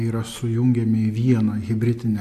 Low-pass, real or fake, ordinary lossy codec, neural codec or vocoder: 14.4 kHz; real; AAC, 64 kbps; none